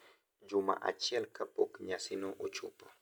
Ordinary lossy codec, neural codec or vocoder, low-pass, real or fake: none; none; none; real